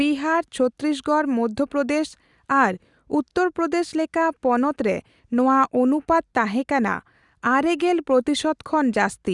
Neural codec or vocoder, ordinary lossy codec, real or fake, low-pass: none; Opus, 64 kbps; real; 10.8 kHz